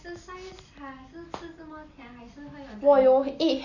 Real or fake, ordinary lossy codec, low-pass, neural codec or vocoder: real; none; 7.2 kHz; none